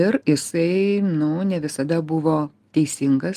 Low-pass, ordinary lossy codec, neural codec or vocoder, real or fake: 14.4 kHz; Opus, 32 kbps; none; real